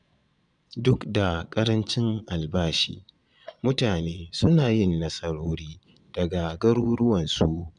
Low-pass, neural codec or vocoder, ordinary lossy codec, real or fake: 9.9 kHz; vocoder, 22.05 kHz, 80 mel bands, Vocos; none; fake